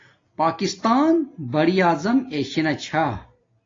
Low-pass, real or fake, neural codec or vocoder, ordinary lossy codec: 7.2 kHz; real; none; AAC, 32 kbps